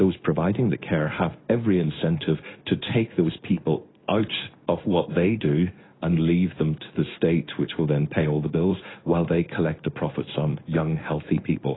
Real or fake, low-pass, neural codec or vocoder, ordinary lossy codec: fake; 7.2 kHz; codec, 16 kHz in and 24 kHz out, 1 kbps, XY-Tokenizer; AAC, 16 kbps